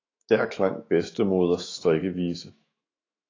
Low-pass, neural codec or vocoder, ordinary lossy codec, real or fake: 7.2 kHz; autoencoder, 48 kHz, 128 numbers a frame, DAC-VAE, trained on Japanese speech; AAC, 32 kbps; fake